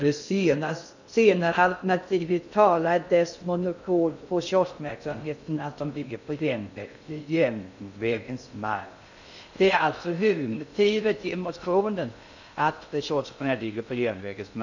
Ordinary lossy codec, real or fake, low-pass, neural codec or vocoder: none; fake; 7.2 kHz; codec, 16 kHz in and 24 kHz out, 0.6 kbps, FocalCodec, streaming, 2048 codes